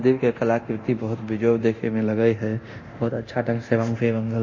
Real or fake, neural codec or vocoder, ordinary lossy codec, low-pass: fake; codec, 24 kHz, 0.9 kbps, DualCodec; MP3, 32 kbps; 7.2 kHz